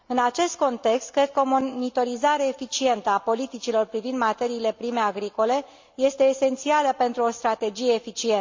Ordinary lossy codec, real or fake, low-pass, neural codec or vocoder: MP3, 64 kbps; real; 7.2 kHz; none